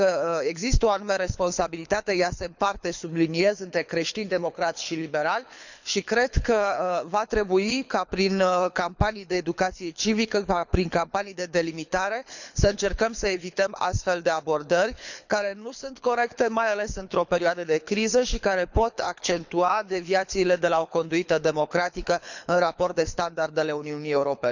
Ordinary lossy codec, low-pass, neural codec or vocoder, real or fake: none; 7.2 kHz; codec, 24 kHz, 6 kbps, HILCodec; fake